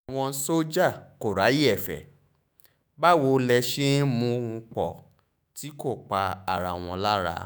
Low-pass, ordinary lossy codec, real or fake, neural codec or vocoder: none; none; fake; autoencoder, 48 kHz, 128 numbers a frame, DAC-VAE, trained on Japanese speech